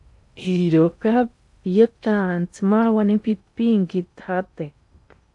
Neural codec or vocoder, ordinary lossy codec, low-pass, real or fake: codec, 16 kHz in and 24 kHz out, 0.6 kbps, FocalCodec, streaming, 2048 codes; AAC, 64 kbps; 10.8 kHz; fake